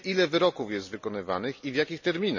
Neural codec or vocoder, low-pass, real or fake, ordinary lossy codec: none; 7.2 kHz; real; none